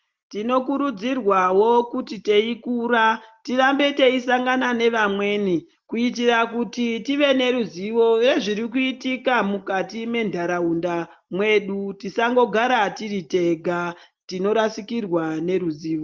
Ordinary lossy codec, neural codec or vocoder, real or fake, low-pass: Opus, 32 kbps; none; real; 7.2 kHz